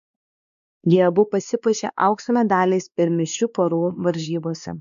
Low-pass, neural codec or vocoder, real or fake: 7.2 kHz; codec, 16 kHz, 2 kbps, X-Codec, WavLM features, trained on Multilingual LibriSpeech; fake